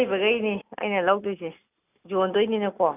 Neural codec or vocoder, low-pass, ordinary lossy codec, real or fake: none; 3.6 kHz; none; real